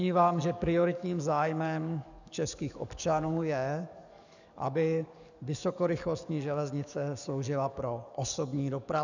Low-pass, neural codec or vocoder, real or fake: 7.2 kHz; codec, 44.1 kHz, 7.8 kbps, DAC; fake